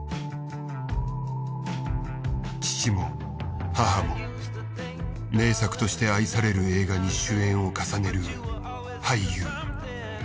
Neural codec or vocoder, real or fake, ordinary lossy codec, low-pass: none; real; none; none